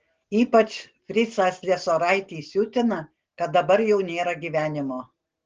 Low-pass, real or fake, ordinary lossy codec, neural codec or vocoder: 7.2 kHz; real; Opus, 16 kbps; none